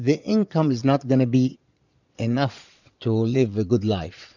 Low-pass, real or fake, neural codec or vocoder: 7.2 kHz; fake; vocoder, 22.05 kHz, 80 mel bands, Vocos